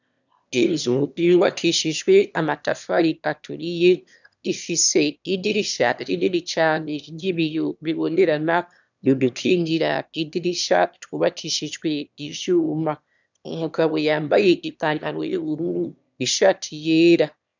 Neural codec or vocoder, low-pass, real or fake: autoencoder, 22.05 kHz, a latent of 192 numbers a frame, VITS, trained on one speaker; 7.2 kHz; fake